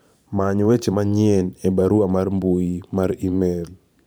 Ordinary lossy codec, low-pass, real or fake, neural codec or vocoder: none; none; fake; vocoder, 44.1 kHz, 128 mel bands every 512 samples, BigVGAN v2